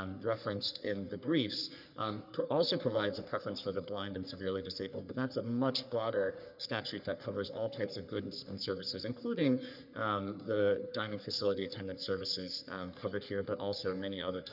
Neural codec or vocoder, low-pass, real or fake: codec, 44.1 kHz, 3.4 kbps, Pupu-Codec; 5.4 kHz; fake